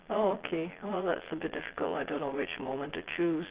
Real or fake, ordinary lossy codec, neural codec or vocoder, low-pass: fake; Opus, 16 kbps; vocoder, 22.05 kHz, 80 mel bands, Vocos; 3.6 kHz